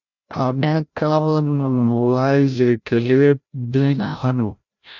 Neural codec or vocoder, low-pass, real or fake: codec, 16 kHz, 0.5 kbps, FreqCodec, larger model; 7.2 kHz; fake